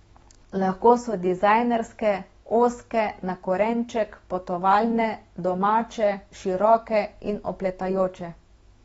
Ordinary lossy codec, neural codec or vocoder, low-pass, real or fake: AAC, 24 kbps; autoencoder, 48 kHz, 128 numbers a frame, DAC-VAE, trained on Japanese speech; 19.8 kHz; fake